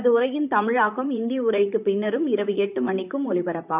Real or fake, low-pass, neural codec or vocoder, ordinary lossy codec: fake; 3.6 kHz; vocoder, 44.1 kHz, 128 mel bands, Pupu-Vocoder; none